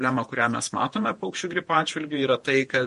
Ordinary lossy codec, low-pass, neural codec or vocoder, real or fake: MP3, 48 kbps; 14.4 kHz; vocoder, 48 kHz, 128 mel bands, Vocos; fake